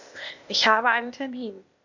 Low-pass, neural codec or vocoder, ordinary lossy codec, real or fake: 7.2 kHz; codec, 16 kHz, 0.8 kbps, ZipCodec; MP3, 64 kbps; fake